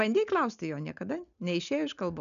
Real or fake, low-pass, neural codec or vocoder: real; 7.2 kHz; none